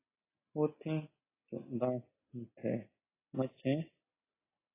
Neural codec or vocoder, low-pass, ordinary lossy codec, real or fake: none; 3.6 kHz; AAC, 16 kbps; real